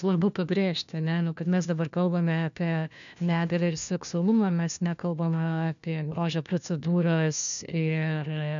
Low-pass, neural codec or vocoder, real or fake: 7.2 kHz; codec, 16 kHz, 1 kbps, FunCodec, trained on LibriTTS, 50 frames a second; fake